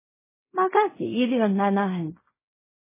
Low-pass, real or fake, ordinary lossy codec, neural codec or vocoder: 3.6 kHz; fake; MP3, 16 kbps; codec, 16 kHz in and 24 kHz out, 0.4 kbps, LongCat-Audio-Codec, fine tuned four codebook decoder